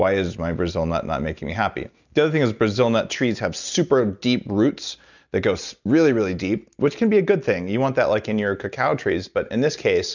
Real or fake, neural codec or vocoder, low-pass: real; none; 7.2 kHz